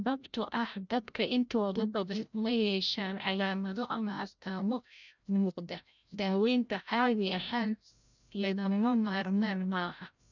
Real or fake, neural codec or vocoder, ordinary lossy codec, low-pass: fake; codec, 16 kHz, 0.5 kbps, FreqCodec, larger model; none; 7.2 kHz